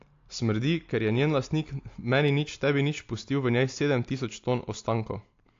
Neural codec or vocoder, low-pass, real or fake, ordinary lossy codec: none; 7.2 kHz; real; AAC, 48 kbps